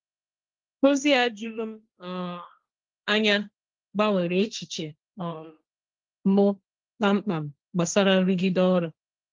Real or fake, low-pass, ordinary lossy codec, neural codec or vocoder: fake; 7.2 kHz; Opus, 32 kbps; codec, 16 kHz, 1.1 kbps, Voila-Tokenizer